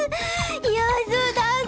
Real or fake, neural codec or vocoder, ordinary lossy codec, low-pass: real; none; none; none